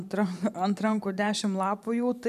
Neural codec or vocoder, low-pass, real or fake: vocoder, 44.1 kHz, 128 mel bands every 512 samples, BigVGAN v2; 14.4 kHz; fake